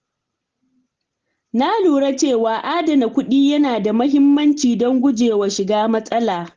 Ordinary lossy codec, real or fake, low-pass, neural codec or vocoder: Opus, 16 kbps; real; 7.2 kHz; none